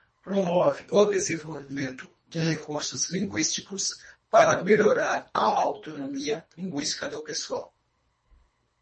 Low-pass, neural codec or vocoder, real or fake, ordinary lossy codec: 10.8 kHz; codec, 24 kHz, 1.5 kbps, HILCodec; fake; MP3, 32 kbps